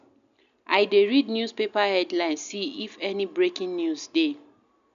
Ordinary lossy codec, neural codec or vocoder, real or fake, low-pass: none; none; real; 7.2 kHz